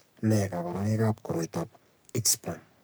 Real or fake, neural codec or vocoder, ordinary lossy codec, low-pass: fake; codec, 44.1 kHz, 3.4 kbps, Pupu-Codec; none; none